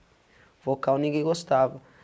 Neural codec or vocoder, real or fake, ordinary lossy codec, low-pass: none; real; none; none